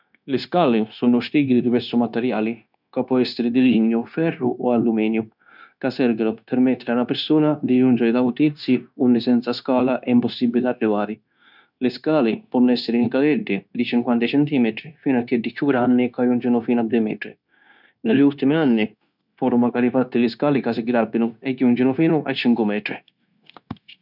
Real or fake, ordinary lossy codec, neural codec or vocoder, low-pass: fake; none; codec, 16 kHz, 0.9 kbps, LongCat-Audio-Codec; 5.4 kHz